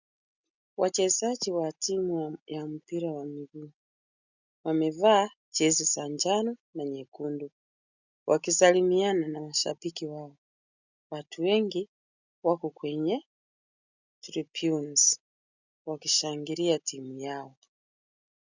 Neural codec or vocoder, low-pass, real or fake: none; 7.2 kHz; real